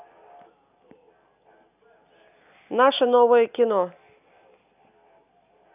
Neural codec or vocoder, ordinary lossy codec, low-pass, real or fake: none; none; 3.6 kHz; real